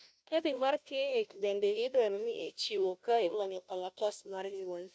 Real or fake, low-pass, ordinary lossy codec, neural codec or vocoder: fake; none; none; codec, 16 kHz, 0.5 kbps, FunCodec, trained on Chinese and English, 25 frames a second